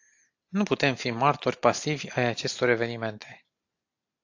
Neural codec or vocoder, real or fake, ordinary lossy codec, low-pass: none; real; AAC, 48 kbps; 7.2 kHz